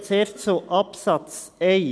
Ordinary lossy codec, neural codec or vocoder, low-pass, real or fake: none; none; none; real